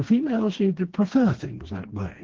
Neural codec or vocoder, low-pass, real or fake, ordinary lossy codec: codec, 16 kHz, 2 kbps, FreqCodec, smaller model; 7.2 kHz; fake; Opus, 16 kbps